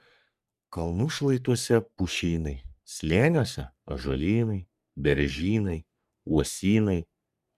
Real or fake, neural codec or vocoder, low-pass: fake; codec, 44.1 kHz, 3.4 kbps, Pupu-Codec; 14.4 kHz